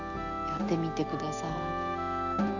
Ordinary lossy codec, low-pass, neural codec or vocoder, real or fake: none; 7.2 kHz; none; real